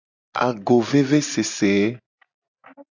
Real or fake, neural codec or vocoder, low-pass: real; none; 7.2 kHz